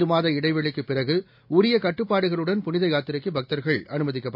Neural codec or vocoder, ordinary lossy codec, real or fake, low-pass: none; MP3, 48 kbps; real; 5.4 kHz